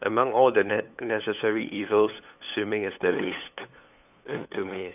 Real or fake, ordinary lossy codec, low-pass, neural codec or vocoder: fake; none; 3.6 kHz; codec, 16 kHz, 8 kbps, FunCodec, trained on LibriTTS, 25 frames a second